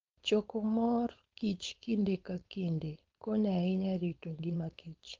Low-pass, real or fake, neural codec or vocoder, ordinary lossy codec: 7.2 kHz; fake; codec, 16 kHz, 4.8 kbps, FACodec; Opus, 32 kbps